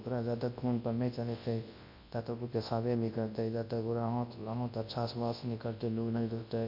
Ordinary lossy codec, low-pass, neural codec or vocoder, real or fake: MP3, 32 kbps; 5.4 kHz; codec, 24 kHz, 0.9 kbps, WavTokenizer, large speech release; fake